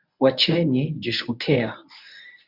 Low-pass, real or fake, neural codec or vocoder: 5.4 kHz; fake; codec, 24 kHz, 0.9 kbps, WavTokenizer, medium speech release version 1